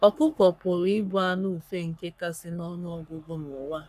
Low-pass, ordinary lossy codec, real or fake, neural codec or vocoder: 14.4 kHz; none; fake; codec, 44.1 kHz, 3.4 kbps, Pupu-Codec